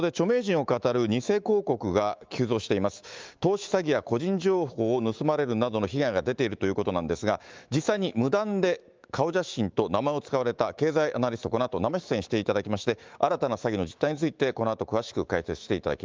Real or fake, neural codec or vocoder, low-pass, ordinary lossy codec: fake; autoencoder, 48 kHz, 128 numbers a frame, DAC-VAE, trained on Japanese speech; 7.2 kHz; Opus, 32 kbps